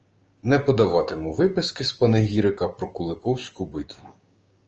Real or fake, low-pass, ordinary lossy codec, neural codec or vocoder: fake; 7.2 kHz; Opus, 24 kbps; codec, 16 kHz, 6 kbps, DAC